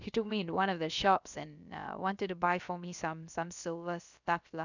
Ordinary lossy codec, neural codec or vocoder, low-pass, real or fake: none; codec, 16 kHz, about 1 kbps, DyCAST, with the encoder's durations; 7.2 kHz; fake